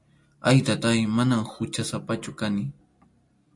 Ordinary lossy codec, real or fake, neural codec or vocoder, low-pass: MP3, 48 kbps; real; none; 10.8 kHz